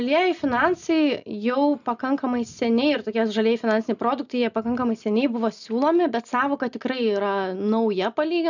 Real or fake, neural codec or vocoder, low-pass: real; none; 7.2 kHz